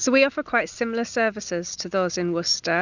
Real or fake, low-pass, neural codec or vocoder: real; 7.2 kHz; none